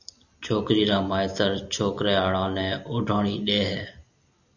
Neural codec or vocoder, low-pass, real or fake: none; 7.2 kHz; real